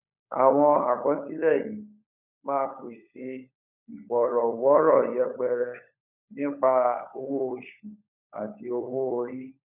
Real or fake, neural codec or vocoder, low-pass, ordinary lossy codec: fake; codec, 16 kHz, 16 kbps, FunCodec, trained on LibriTTS, 50 frames a second; 3.6 kHz; none